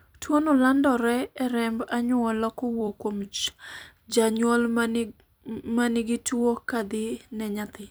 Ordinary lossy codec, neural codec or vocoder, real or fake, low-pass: none; vocoder, 44.1 kHz, 128 mel bands every 256 samples, BigVGAN v2; fake; none